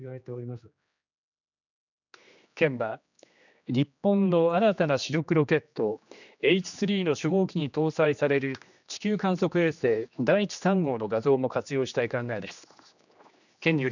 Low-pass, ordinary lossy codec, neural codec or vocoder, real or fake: 7.2 kHz; none; codec, 16 kHz, 2 kbps, X-Codec, HuBERT features, trained on general audio; fake